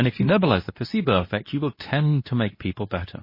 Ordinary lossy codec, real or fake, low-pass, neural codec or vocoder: MP3, 24 kbps; fake; 5.4 kHz; codec, 24 kHz, 0.9 kbps, WavTokenizer, medium speech release version 2